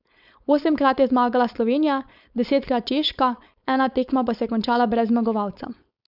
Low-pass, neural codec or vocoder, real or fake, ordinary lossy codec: 5.4 kHz; codec, 16 kHz, 4.8 kbps, FACodec; fake; none